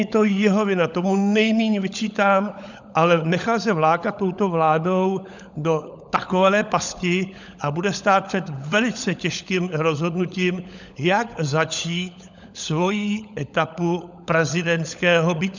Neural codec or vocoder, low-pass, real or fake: codec, 16 kHz, 16 kbps, FunCodec, trained on LibriTTS, 50 frames a second; 7.2 kHz; fake